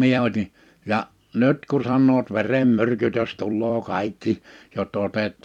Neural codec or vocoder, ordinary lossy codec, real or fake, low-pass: vocoder, 44.1 kHz, 128 mel bands every 256 samples, BigVGAN v2; none; fake; 19.8 kHz